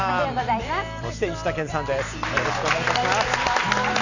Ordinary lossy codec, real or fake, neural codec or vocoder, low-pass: none; real; none; 7.2 kHz